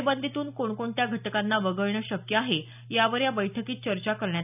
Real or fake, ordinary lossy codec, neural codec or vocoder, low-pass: real; none; none; 3.6 kHz